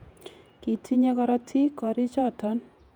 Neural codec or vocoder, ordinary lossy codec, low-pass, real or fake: vocoder, 48 kHz, 128 mel bands, Vocos; none; 19.8 kHz; fake